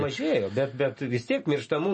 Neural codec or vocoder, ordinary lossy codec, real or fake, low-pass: none; MP3, 32 kbps; real; 10.8 kHz